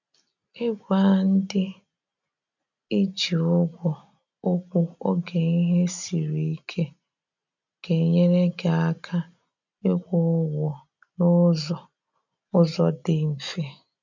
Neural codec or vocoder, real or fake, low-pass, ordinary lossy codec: none; real; 7.2 kHz; none